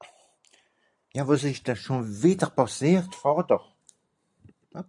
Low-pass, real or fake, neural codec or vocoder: 10.8 kHz; real; none